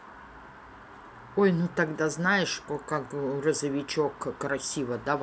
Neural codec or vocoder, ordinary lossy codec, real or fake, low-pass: none; none; real; none